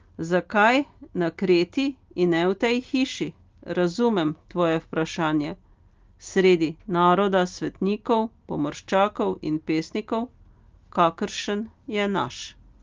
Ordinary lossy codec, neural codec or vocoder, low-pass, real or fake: Opus, 24 kbps; none; 7.2 kHz; real